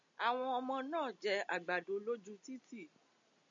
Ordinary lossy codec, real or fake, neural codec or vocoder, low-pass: MP3, 64 kbps; real; none; 7.2 kHz